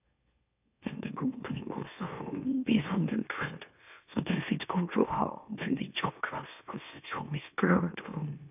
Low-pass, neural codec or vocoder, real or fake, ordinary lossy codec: 3.6 kHz; autoencoder, 44.1 kHz, a latent of 192 numbers a frame, MeloTTS; fake; none